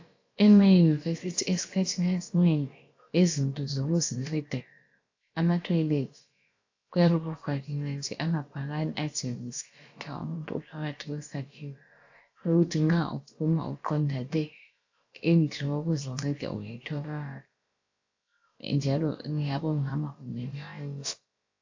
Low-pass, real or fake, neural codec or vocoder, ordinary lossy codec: 7.2 kHz; fake; codec, 16 kHz, about 1 kbps, DyCAST, with the encoder's durations; AAC, 48 kbps